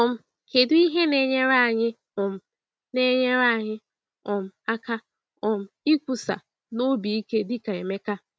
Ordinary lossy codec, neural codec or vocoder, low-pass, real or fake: none; none; none; real